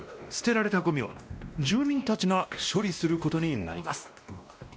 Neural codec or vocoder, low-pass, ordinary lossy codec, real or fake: codec, 16 kHz, 1 kbps, X-Codec, WavLM features, trained on Multilingual LibriSpeech; none; none; fake